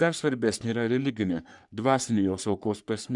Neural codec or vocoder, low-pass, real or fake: codec, 44.1 kHz, 3.4 kbps, Pupu-Codec; 10.8 kHz; fake